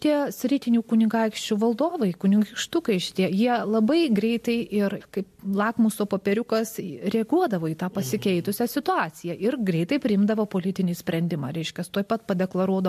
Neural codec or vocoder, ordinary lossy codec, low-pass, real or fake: none; MP3, 64 kbps; 14.4 kHz; real